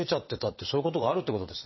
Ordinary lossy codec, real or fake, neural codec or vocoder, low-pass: MP3, 24 kbps; fake; vocoder, 22.05 kHz, 80 mel bands, WaveNeXt; 7.2 kHz